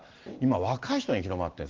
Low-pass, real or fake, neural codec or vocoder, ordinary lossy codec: 7.2 kHz; real; none; Opus, 16 kbps